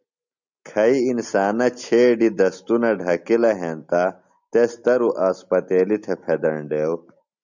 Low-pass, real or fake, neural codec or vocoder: 7.2 kHz; real; none